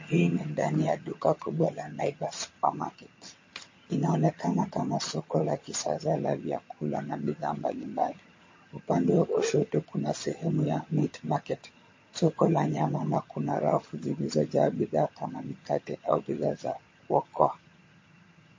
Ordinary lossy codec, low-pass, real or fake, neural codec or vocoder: MP3, 32 kbps; 7.2 kHz; fake; vocoder, 22.05 kHz, 80 mel bands, HiFi-GAN